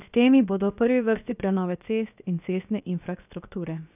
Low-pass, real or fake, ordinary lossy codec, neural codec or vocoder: 3.6 kHz; fake; none; codec, 16 kHz, 0.7 kbps, FocalCodec